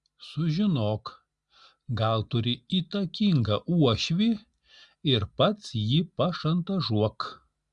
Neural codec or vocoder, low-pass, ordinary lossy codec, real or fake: none; 10.8 kHz; Opus, 64 kbps; real